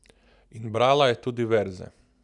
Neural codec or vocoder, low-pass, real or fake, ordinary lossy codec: none; 10.8 kHz; real; none